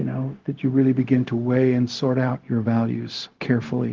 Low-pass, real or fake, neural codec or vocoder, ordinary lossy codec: 7.2 kHz; fake; codec, 16 kHz, 0.4 kbps, LongCat-Audio-Codec; Opus, 16 kbps